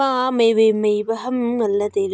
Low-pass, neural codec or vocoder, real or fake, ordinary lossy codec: none; none; real; none